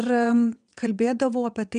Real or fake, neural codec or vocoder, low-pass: fake; vocoder, 22.05 kHz, 80 mel bands, WaveNeXt; 9.9 kHz